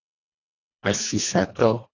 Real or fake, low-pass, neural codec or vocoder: fake; 7.2 kHz; codec, 24 kHz, 1.5 kbps, HILCodec